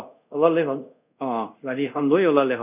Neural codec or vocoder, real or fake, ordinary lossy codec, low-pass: codec, 24 kHz, 0.5 kbps, DualCodec; fake; none; 3.6 kHz